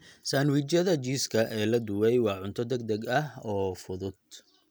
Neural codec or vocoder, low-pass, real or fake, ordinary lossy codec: none; none; real; none